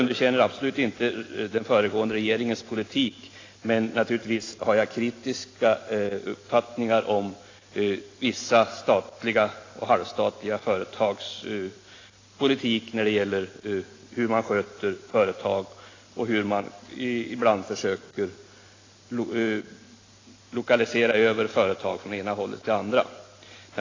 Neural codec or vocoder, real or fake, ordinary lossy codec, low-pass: none; real; AAC, 32 kbps; 7.2 kHz